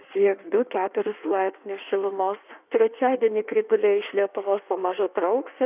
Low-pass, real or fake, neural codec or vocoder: 3.6 kHz; fake; codec, 16 kHz in and 24 kHz out, 1.1 kbps, FireRedTTS-2 codec